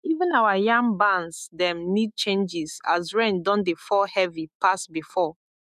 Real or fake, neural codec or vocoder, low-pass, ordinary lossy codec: fake; autoencoder, 48 kHz, 128 numbers a frame, DAC-VAE, trained on Japanese speech; 14.4 kHz; none